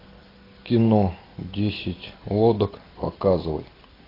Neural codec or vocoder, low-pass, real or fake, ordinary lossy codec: none; 5.4 kHz; real; AAC, 24 kbps